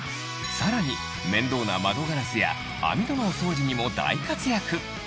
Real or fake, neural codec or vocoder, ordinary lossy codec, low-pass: real; none; none; none